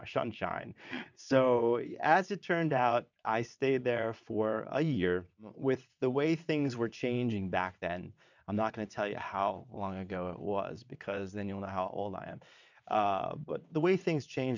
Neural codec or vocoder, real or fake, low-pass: vocoder, 22.05 kHz, 80 mel bands, WaveNeXt; fake; 7.2 kHz